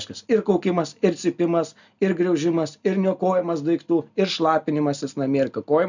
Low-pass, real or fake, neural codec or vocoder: 7.2 kHz; real; none